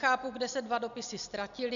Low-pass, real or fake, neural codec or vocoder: 7.2 kHz; real; none